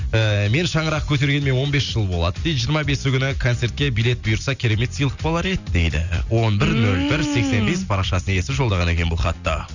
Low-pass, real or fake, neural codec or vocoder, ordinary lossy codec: 7.2 kHz; real; none; none